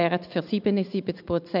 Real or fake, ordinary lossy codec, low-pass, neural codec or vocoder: real; none; 5.4 kHz; none